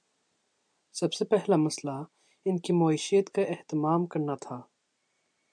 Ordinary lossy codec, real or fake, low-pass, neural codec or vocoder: MP3, 96 kbps; real; 9.9 kHz; none